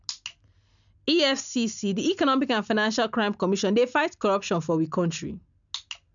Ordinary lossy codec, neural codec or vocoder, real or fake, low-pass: none; none; real; 7.2 kHz